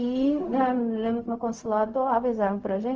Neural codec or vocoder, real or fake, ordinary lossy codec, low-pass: codec, 16 kHz, 0.4 kbps, LongCat-Audio-Codec; fake; Opus, 24 kbps; 7.2 kHz